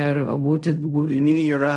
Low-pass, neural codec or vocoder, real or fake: 10.8 kHz; codec, 16 kHz in and 24 kHz out, 0.4 kbps, LongCat-Audio-Codec, fine tuned four codebook decoder; fake